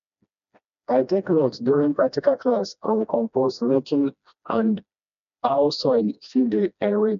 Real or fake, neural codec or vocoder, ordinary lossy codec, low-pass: fake; codec, 16 kHz, 1 kbps, FreqCodec, smaller model; none; 7.2 kHz